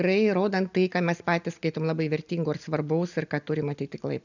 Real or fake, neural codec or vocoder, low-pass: real; none; 7.2 kHz